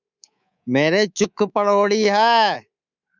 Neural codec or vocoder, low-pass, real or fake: codec, 24 kHz, 3.1 kbps, DualCodec; 7.2 kHz; fake